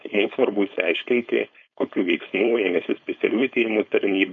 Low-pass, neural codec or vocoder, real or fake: 7.2 kHz; codec, 16 kHz, 4.8 kbps, FACodec; fake